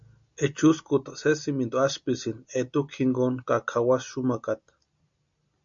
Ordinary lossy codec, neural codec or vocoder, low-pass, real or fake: MP3, 64 kbps; none; 7.2 kHz; real